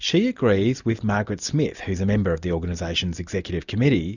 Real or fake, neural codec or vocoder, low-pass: real; none; 7.2 kHz